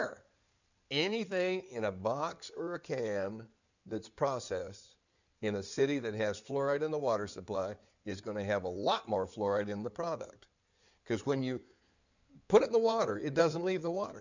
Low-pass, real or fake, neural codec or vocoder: 7.2 kHz; fake; codec, 16 kHz in and 24 kHz out, 2.2 kbps, FireRedTTS-2 codec